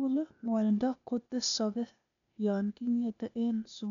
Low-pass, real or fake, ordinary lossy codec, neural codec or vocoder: 7.2 kHz; fake; none; codec, 16 kHz, 0.8 kbps, ZipCodec